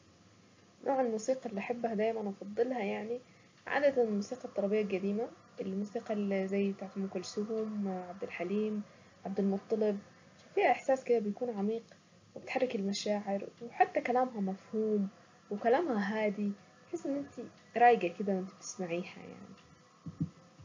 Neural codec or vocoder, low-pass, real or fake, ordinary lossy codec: none; 7.2 kHz; real; MP3, 48 kbps